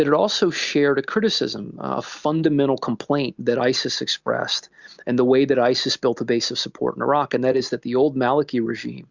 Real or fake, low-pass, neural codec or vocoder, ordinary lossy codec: real; 7.2 kHz; none; Opus, 64 kbps